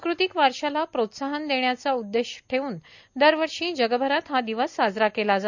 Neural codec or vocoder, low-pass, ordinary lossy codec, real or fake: none; 7.2 kHz; none; real